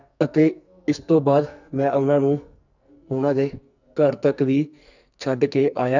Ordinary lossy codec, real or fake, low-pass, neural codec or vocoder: none; fake; 7.2 kHz; codec, 44.1 kHz, 2.6 kbps, SNAC